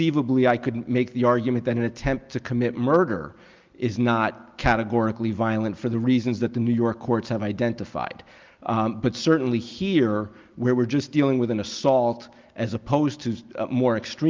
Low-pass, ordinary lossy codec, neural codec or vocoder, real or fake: 7.2 kHz; Opus, 24 kbps; none; real